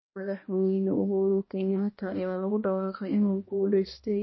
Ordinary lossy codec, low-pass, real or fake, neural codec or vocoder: MP3, 24 kbps; 7.2 kHz; fake; codec, 16 kHz, 1 kbps, X-Codec, HuBERT features, trained on balanced general audio